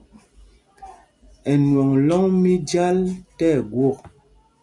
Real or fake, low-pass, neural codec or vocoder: real; 10.8 kHz; none